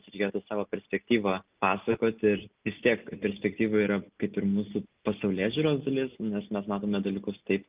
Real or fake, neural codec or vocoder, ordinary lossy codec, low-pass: real; none; Opus, 24 kbps; 3.6 kHz